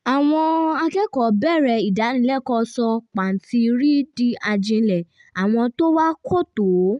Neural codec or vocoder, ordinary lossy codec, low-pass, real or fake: none; none; 10.8 kHz; real